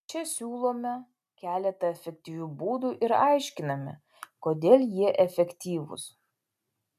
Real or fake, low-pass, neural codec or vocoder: real; 14.4 kHz; none